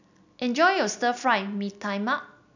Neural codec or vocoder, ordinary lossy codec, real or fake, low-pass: none; none; real; 7.2 kHz